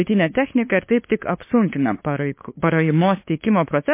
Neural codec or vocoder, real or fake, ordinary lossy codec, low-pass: codec, 16 kHz, 2 kbps, FunCodec, trained on LibriTTS, 25 frames a second; fake; MP3, 24 kbps; 3.6 kHz